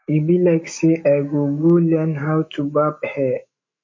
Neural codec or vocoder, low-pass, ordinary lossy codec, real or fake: autoencoder, 48 kHz, 128 numbers a frame, DAC-VAE, trained on Japanese speech; 7.2 kHz; MP3, 32 kbps; fake